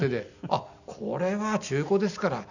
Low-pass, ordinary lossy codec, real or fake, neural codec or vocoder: 7.2 kHz; none; real; none